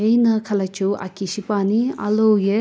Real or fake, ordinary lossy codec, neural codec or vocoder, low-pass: real; none; none; none